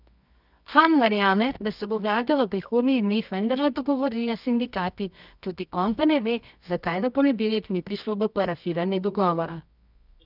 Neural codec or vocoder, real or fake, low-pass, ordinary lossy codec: codec, 24 kHz, 0.9 kbps, WavTokenizer, medium music audio release; fake; 5.4 kHz; none